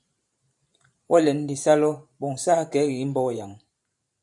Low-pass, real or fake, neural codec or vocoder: 10.8 kHz; fake; vocoder, 44.1 kHz, 128 mel bands every 512 samples, BigVGAN v2